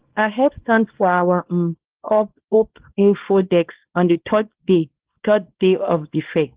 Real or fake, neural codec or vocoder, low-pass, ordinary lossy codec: fake; codec, 24 kHz, 0.9 kbps, WavTokenizer, small release; 3.6 kHz; Opus, 16 kbps